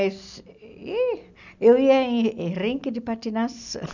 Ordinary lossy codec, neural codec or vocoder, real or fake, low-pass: none; none; real; 7.2 kHz